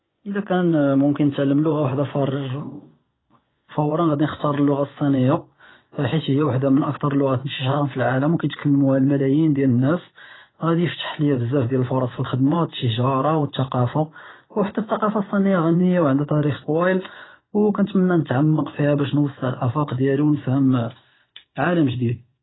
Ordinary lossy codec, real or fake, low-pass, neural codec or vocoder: AAC, 16 kbps; fake; 7.2 kHz; vocoder, 24 kHz, 100 mel bands, Vocos